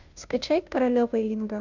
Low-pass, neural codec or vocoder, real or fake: 7.2 kHz; codec, 16 kHz, 0.5 kbps, FunCodec, trained on Chinese and English, 25 frames a second; fake